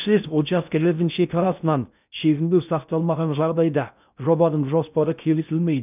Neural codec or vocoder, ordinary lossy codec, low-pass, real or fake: codec, 16 kHz in and 24 kHz out, 0.6 kbps, FocalCodec, streaming, 4096 codes; none; 3.6 kHz; fake